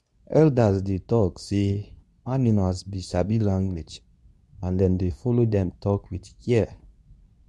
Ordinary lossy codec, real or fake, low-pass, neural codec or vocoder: none; fake; none; codec, 24 kHz, 0.9 kbps, WavTokenizer, medium speech release version 2